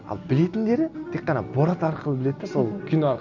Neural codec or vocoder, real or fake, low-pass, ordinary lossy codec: none; real; 7.2 kHz; none